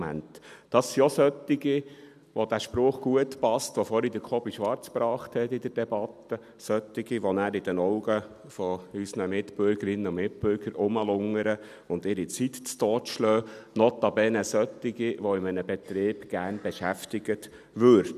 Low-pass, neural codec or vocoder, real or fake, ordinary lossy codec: 14.4 kHz; none; real; none